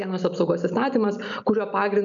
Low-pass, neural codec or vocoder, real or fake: 7.2 kHz; none; real